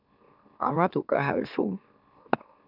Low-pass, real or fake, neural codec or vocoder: 5.4 kHz; fake; autoencoder, 44.1 kHz, a latent of 192 numbers a frame, MeloTTS